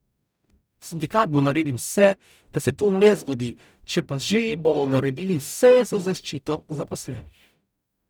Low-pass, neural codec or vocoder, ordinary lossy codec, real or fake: none; codec, 44.1 kHz, 0.9 kbps, DAC; none; fake